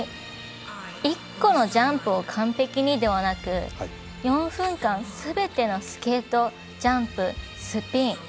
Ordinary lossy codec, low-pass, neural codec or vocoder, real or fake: none; none; none; real